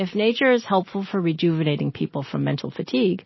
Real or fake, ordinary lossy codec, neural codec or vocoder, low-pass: real; MP3, 24 kbps; none; 7.2 kHz